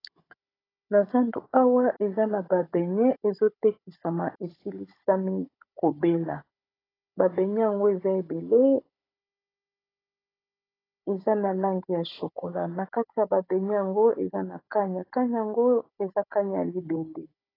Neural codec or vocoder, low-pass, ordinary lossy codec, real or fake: codec, 16 kHz, 16 kbps, FunCodec, trained on Chinese and English, 50 frames a second; 5.4 kHz; AAC, 24 kbps; fake